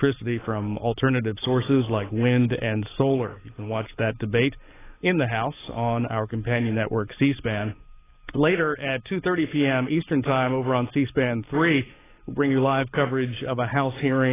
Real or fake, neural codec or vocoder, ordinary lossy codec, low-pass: fake; codec, 44.1 kHz, 7.8 kbps, DAC; AAC, 16 kbps; 3.6 kHz